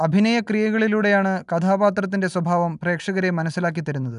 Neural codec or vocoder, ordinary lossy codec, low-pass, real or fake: none; none; 10.8 kHz; real